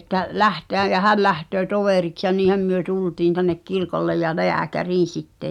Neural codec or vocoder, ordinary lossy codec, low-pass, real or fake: none; none; 19.8 kHz; real